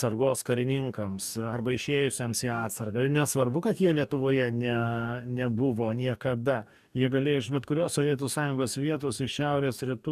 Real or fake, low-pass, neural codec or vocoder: fake; 14.4 kHz; codec, 44.1 kHz, 2.6 kbps, DAC